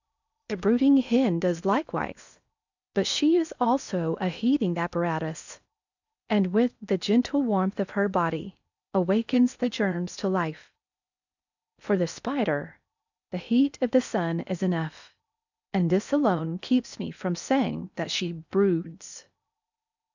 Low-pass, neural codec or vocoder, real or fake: 7.2 kHz; codec, 16 kHz in and 24 kHz out, 0.8 kbps, FocalCodec, streaming, 65536 codes; fake